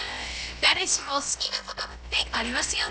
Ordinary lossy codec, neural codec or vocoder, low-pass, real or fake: none; codec, 16 kHz, about 1 kbps, DyCAST, with the encoder's durations; none; fake